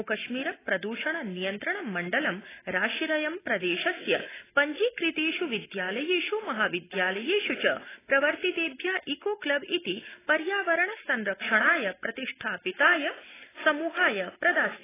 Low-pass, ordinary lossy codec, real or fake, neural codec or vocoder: 3.6 kHz; AAC, 16 kbps; real; none